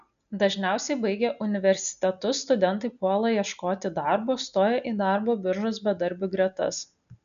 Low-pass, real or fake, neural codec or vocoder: 7.2 kHz; real; none